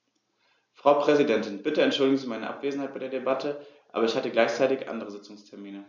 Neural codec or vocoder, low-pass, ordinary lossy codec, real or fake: none; 7.2 kHz; MP3, 64 kbps; real